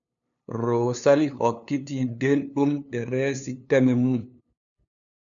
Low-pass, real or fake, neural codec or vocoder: 7.2 kHz; fake; codec, 16 kHz, 2 kbps, FunCodec, trained on LibriTTS, 25 frames a second